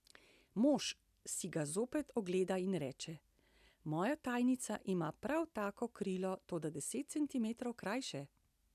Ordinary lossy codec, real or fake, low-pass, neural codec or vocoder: none; real; 14.4 kHz; none